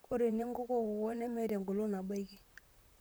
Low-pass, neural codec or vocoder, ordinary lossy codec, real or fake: none; vocoder, 44.1 kHz, 128 mel bands, Pupu-Vocoder; none; fake